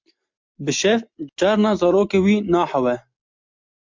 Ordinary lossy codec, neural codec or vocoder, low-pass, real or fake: MP3, 64 kbps; none; 7.2 kHz; real